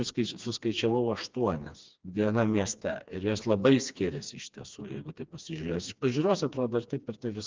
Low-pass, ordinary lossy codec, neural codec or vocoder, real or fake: 7.2 kHz; Opus, 24 kbps; codec, 16 kHz, 2 kbps, FreqCodec, smaller model; fake